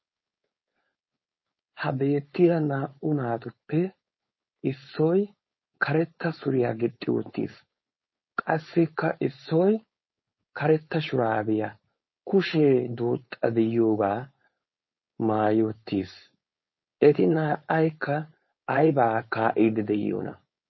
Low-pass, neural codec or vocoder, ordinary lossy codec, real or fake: 7.2 kHz; codec, 16 kHz, 4.8 kbps, FACodec; MP3, 24 kbps; fake